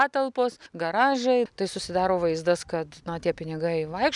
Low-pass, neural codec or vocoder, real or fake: 10.8 kHz; none; real